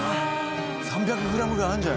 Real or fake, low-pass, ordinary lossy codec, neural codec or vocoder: real; none; none; none